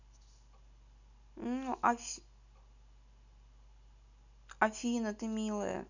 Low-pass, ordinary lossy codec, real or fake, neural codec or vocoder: 7.2 kHz; none; real; none